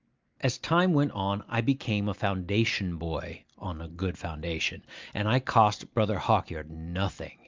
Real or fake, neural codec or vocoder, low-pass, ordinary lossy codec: real; none; 7.2 kHz; Opus, 24 kbps